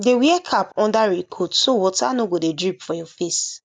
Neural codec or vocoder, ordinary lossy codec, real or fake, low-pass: none; none; real; none